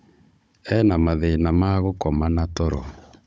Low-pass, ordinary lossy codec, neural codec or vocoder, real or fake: none; none; codec, 16 kHz, 16 kbps, FunCodec, trained on Chinese and English, 50 frames a second; fake